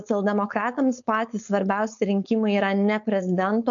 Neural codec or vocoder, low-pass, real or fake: codec, 16 kHz, 4.8 kbps, FACodec; 7.2 kHz; fake